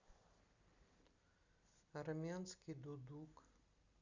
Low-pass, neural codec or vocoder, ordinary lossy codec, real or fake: 7.2 kHz; none; Opus, 64 kbps; real